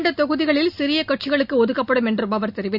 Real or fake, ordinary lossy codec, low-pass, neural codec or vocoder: real; none; 5.4 kHz; none